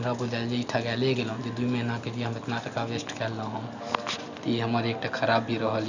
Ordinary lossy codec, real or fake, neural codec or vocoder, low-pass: none; real; none; 7.2 kHz